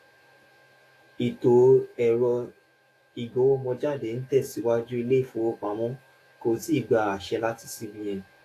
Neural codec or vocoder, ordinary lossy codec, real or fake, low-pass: autoencoder, 48 kHz, 128 numbers a frame, DAC-VAE, trained on Japanese speech; AAC, 48 kbps; fake; 14.4 kHz